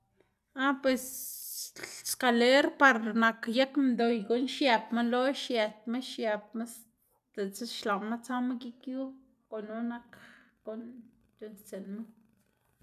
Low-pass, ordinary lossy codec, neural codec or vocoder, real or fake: 14.4 kHz; none; none; real